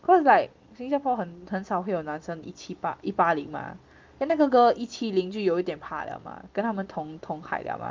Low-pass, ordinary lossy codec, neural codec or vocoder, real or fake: 7.2 kHz; Opus, 32 kbps; none; real